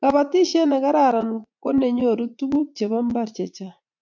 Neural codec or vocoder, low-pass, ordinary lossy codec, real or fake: vocoder, 44.1 kHz, 80 mel bands, Vocos; 7.2 kHz; MP3, 64 kbps; fake